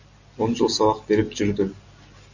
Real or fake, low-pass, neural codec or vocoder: real; 7.2 kHz; none